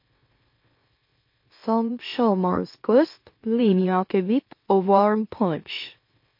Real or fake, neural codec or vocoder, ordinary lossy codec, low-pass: fake; autoencoder, 44.1 kHz, a latent of 192 numbers a frame, MeloTTS; MP3, 32 kbps; 5.4 kHz